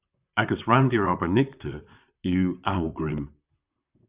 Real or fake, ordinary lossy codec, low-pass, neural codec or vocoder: fake; Opus, 64 kbps; 3.6 kHz; vocoder, 44.1 kHz, 128 mel bands, Pupu-Vocoder